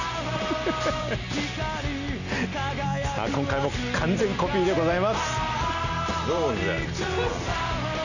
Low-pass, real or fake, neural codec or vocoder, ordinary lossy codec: 7.2 kHz; real; none; none